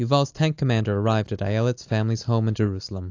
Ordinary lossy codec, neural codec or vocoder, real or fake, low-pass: AAC, 48 kbps; none; real; 7.2 kHz